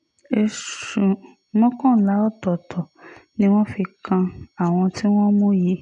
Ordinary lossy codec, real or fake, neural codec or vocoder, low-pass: none; real; none; 10.8 kHz